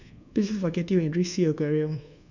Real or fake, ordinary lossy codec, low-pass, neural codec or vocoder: fake; none; 7.2 kHz; codec, 24 kHz, 1.2 kbps, DualCodec